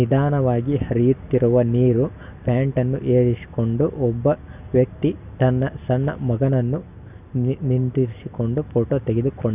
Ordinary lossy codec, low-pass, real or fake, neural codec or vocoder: none; 3.6 kHz; real; none